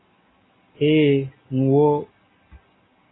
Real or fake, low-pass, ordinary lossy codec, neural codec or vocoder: real; 7.2 kHz; AAC, 16 kbps; none